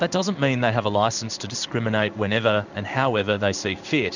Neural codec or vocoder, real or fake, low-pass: codec, 16 kHz in and 24 kHz out, 1 kbps, XY-Tokenizer; fake; 7.2 kHz